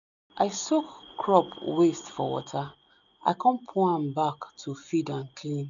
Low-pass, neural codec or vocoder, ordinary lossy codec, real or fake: 7.2 kHz; none; none; real